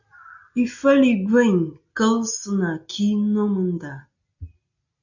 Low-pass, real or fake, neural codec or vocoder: 7.2 kHz; real; none